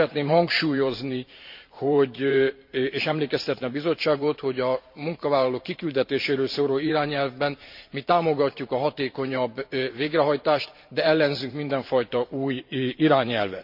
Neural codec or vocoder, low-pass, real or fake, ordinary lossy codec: vocoder, 44.1 kHz, 128 mel bands every 512 samples, BigVGAN v2; 5.4 kHz; fake; MP3, 48 kbps